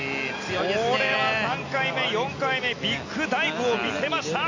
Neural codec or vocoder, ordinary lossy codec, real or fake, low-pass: none; AAC, 48 kbps; real; 7.2 kHz